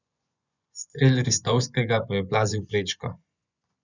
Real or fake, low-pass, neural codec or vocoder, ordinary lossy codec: fake; 7.2 kHz; vocoder, 24 kHz, 100 mel bands, Vocos; none